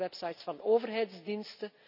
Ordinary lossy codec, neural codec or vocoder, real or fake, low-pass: none; none; real; 5.4 kHz